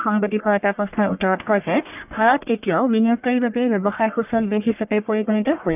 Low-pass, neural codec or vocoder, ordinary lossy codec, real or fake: 3.6 kHz; codec, 44.1 kHz, 1.7 kbps, Pupu-Codec; none; fake